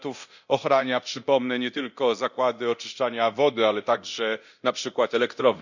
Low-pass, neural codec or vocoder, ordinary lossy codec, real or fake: 7.2 kHz; codec, 24 kHz, 0.9 kbps, DualCodec; none; fake